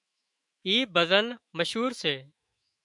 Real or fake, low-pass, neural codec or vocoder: fake; 10.8 kHz; autoencoder, 48 kHz, 128 numbers a frame, DAC-VAE, trained on Japanese speech